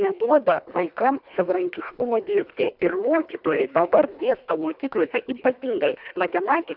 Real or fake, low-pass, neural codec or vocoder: fake; 5.4 kHz; codec, 24 kHz, 1.5 kbps, HILCodec